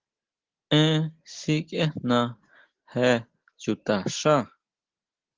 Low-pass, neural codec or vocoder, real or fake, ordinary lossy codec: 7.2 kHz; none; real; Opus, 16 kbps